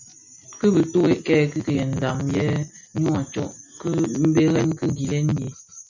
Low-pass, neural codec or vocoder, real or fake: 7.2 kHz; none; real